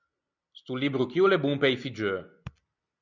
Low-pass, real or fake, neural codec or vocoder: 7.2 kHz; real; none